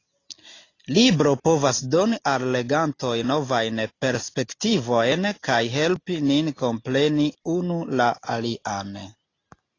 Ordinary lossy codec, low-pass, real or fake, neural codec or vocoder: AAC, 32 kbps; 7.2 kHz; real; none